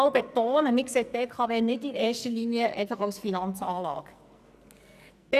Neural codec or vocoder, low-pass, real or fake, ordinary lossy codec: codec, 44.1 kHz, 2.6 kbps, SNAC; 14.4 kHz; fake; none